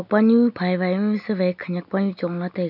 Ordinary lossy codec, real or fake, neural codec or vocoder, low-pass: none; real; none; 5.4 kHz